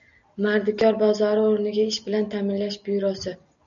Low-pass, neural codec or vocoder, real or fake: 7.2 kHz; none; real